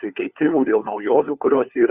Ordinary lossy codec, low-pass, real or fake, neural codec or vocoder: Opus, 64 kbps; 3.6 kHz; fake; codec, 16 kHz, 4 kbps, FunCodec, trained on LibriTTS, 50 frames a second